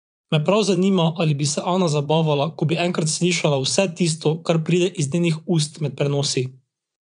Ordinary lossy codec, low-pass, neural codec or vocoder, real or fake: none; 9.9 kHz; vocoder, 22.05 kHz, 80 mel bands, Vocos; fake